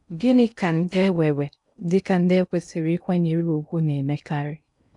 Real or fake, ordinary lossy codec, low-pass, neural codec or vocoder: fake; none; 10.8 kHz; codec, 16 kHz in and 24 kHz out, 0.6 kbps, FocalCodec, streaming, 2048 codes